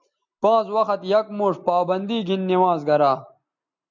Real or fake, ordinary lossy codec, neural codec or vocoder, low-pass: real; MP3, 64 kbps; none; 7.2 kHz